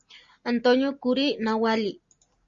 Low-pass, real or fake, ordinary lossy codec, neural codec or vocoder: 7.2 kHz; real; Opus, 64 kbps; none